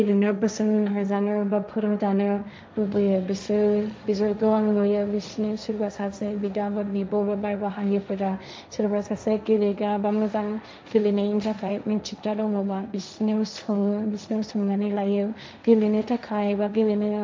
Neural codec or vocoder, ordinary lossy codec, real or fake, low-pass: codec, 16 kHz, 1.1 kbps, Voila-Tokenizer; none; fake; none